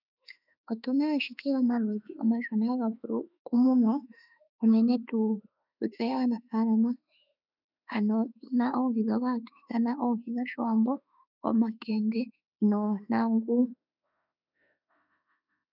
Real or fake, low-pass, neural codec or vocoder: fake; 5.4 kHz; autoencoder, 48 kHz, 32 numbers a frame, DAC-VAE, trained on Japanese speech